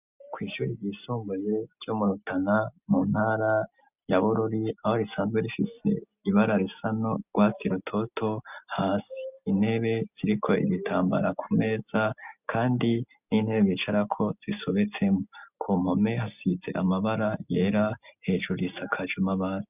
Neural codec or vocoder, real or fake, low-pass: none; real; 3.6 kHz